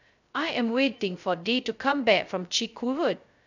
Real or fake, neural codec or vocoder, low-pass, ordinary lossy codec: fake; codec, 16 kHz, 0.2 kbps, FocalCodec; 7.2 kHz; none